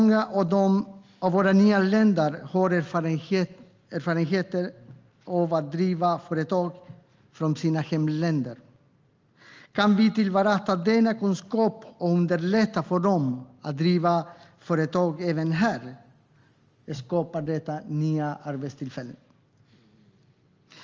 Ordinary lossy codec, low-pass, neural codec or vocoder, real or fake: Opus, 32 kbps; 7.2 kHz; none; real